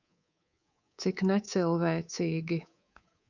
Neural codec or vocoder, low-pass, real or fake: codec, 24 kHz, 3.1 kbps, DualCodec; 7.2 kHz; fake